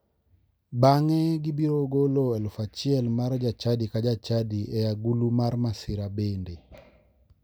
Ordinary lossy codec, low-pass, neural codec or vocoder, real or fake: none; none; none; real